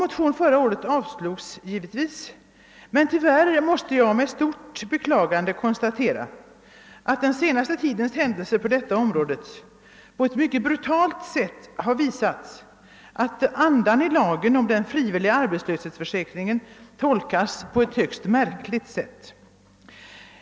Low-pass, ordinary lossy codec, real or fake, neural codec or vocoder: none; none; real; none